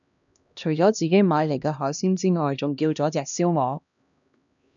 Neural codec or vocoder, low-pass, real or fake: codec, 16 kHz, 1 kbps, X-Codec, HuBERT features, trained on LibriSpeech; 7.2 kHz; fake